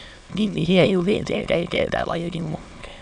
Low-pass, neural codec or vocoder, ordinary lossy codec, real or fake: 9.9 kHz; autoencoder, 22.05 kHz, a latent of 192 numbers a frame, VITS, trained on many speakers; none; fake